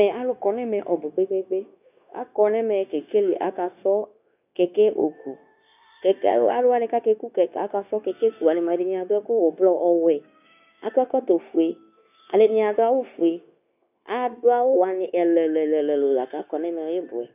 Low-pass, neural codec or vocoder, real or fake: 3.6 kHz; codec, 16 kHz, 0.9 kbps, LongCat-Audio-Codec; fake